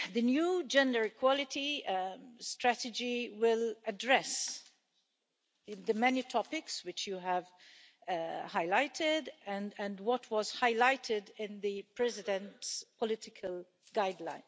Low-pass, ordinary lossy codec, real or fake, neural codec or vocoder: none; none; real; none